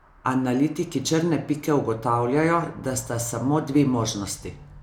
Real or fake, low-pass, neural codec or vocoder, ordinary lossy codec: real; 19.8 kHz; none; none